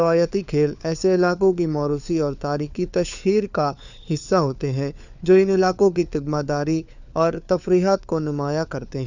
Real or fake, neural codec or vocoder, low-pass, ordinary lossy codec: fake; codec, 16 kHz, 4 kbps, FunCodec, trained on LibriTTS, 50 frames a second; 7.2 kHz; none